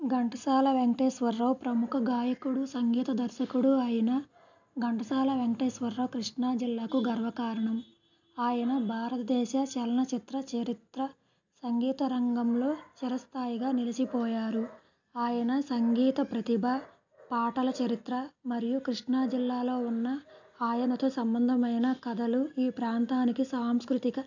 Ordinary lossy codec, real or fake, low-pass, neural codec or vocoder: none; real; 7.2 kHz; none